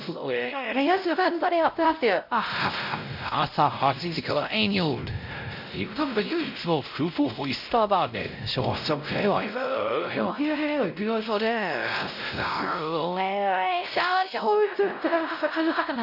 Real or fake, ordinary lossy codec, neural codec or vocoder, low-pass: fake; none; codec, 16 kHz, 0.5 kbps, X-Codec, WavLM features, trained on Multilingual LibriSpeech; 5.4 kHz